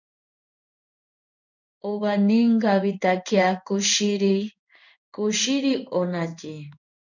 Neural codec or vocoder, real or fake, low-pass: codec, 16 kHz in and 24 kHz out, 1 kbps, XY-Tokenizer; fake; 7.2 kHz